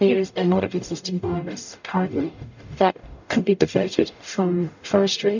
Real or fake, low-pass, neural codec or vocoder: fake; 7.2 kHz; codec, 44.1 kHz, 0.9 kbps, DAC